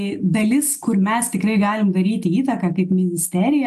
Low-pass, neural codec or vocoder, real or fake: 14.4 kHz; none; real